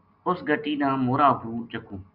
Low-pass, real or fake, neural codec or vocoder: 5.4 kHz; real; none